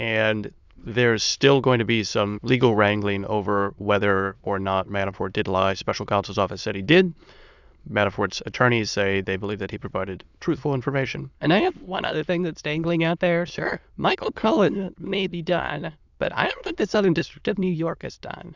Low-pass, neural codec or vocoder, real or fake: 7.2 kHz; autoencoder, 22.05 kHz, a latent of 192 numbers a frame, VITS, trained on many speakers; fake